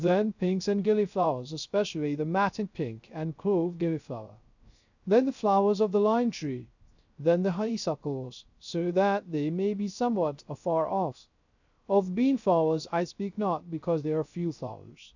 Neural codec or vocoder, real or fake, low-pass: codec, 16 kHz, 0.3 kbps, FocalCodec; fake; 7.2 kHz